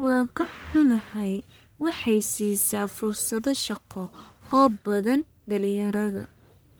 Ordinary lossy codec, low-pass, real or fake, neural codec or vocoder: none; none; fake; codec, 44.1 kHz, 1.7 kbps, Pupu-Codec